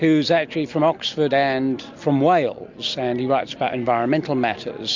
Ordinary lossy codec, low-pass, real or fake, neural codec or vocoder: MP3, 64 kbps; 7.2 kHz; real; none